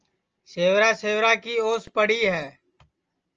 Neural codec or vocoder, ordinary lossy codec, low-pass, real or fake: none; Opus, 24 kbps; 7.2 kHz; real